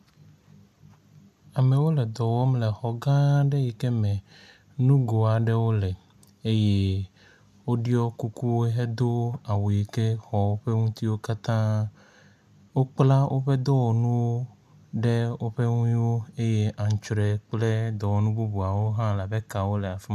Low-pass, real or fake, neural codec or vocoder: 14.4 kHz; real; none